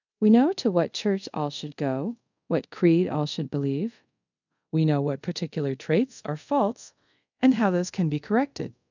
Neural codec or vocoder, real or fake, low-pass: codec, 24 kHz, 0.5 kbps, DualCodec; fake; 7.2 kHz